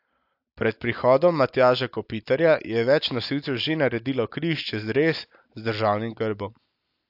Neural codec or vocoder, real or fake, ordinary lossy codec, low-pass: none; real; AAC, 48 kbps; 5.4 kHz